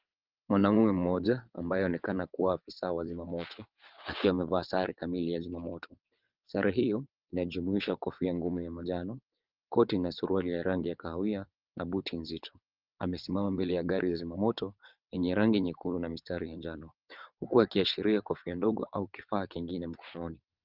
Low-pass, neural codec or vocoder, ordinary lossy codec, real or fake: 5.4 kHz; vocoder, 22.05 kHz, 80 mel bands, WaveNeXt; Opus, 32 kbps; fake